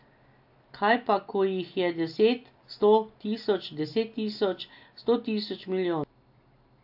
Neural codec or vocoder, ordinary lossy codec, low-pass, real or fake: none; AAC, 48 kbps; 5.4 kHz; real